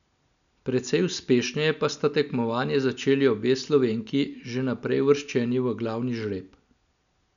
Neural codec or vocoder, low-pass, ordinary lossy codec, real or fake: none; 7.2 kHz; none; real